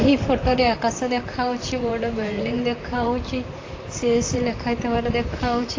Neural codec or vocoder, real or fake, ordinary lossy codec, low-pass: vocoder, 44.1 kHz, 128 mel bands, Pupu-Vocoder; fake; AAC, 32 kbps; 7.2 kHz